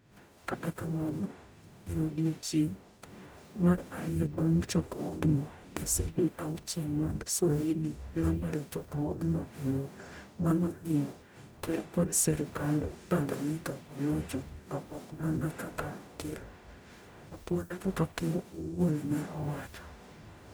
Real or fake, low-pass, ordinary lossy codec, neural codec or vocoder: fake; none; none; codec, 44.1 kHz, 0.9 kbps, DAC